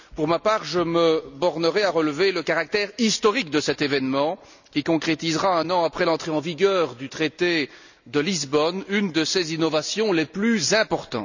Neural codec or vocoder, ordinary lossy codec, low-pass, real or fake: none; none; 7.2 kHz; real